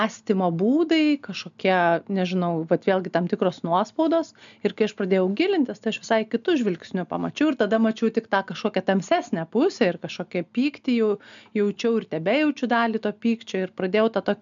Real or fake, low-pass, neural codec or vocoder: real; 7.2 kHz; none